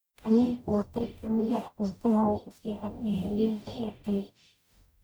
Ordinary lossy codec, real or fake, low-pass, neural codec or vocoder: none; fake; none; codec, 44.1 kHz, 0.9 kbps, DAC